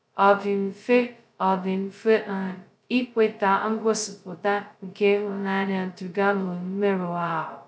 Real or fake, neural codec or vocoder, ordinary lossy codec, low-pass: fake; codec, 16 kHz, 0.2 kbps, FocalCodec; none; none